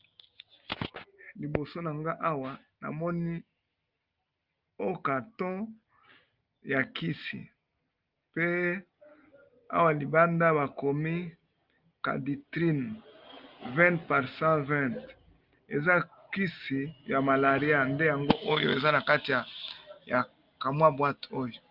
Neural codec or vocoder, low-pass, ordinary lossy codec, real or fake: none; 5.4 kHz; Opus, 24 kbps; real